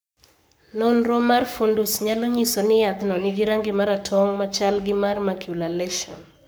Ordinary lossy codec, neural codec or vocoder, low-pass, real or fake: none; codec, 44.1 kHz, 7.8 kbps, Pupu-Codec; none; fake